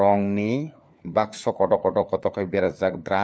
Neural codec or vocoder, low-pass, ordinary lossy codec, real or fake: codec, 16 kHz, 4 kbps, FunCodec, trained on LibriTTS, 50 frames a second; none; none; fake